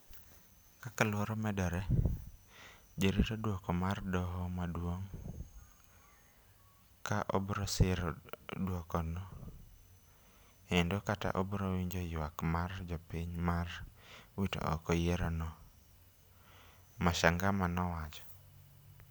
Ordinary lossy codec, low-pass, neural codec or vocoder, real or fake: none; none; none; real